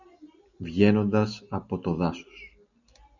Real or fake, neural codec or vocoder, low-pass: real; none; 7.2 kHz